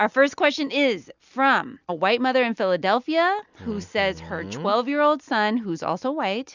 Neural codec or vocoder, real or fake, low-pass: none; real; 7.2 kHz